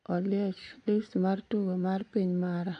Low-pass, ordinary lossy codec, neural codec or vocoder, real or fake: 9.9 kHz; AAC, 48 kbps; none; real